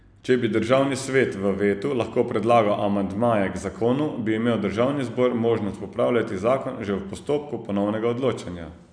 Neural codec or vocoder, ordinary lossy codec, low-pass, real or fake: none; none; 9.9 kHz; real